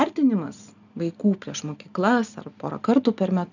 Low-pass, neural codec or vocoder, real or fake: 7.2 kHz; none; real